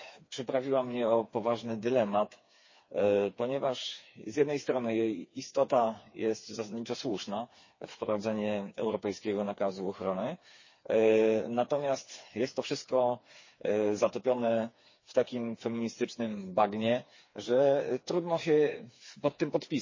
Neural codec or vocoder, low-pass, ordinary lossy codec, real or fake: codec, 16 kHz, 4 kbps, FreqCodec, smaller model; 7.2 kHz; MP3, 32 kbps; fake